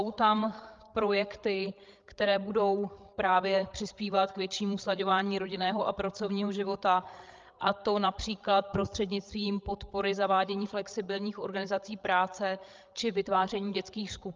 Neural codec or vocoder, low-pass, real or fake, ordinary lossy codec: codec, 16 kHz, 8 kbps, FreqCodec, larger model; 7.2 kHz; fake; Opus, 24 kbps